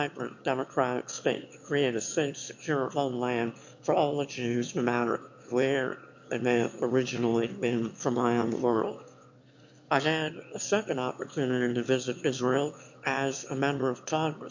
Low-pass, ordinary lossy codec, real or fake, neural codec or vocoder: 7.2 kHz; MP3, 48 kbps; fake; autoencoder, 22.05 kHz, a latent of 192 numbers a frame, VITS, trained on one speaker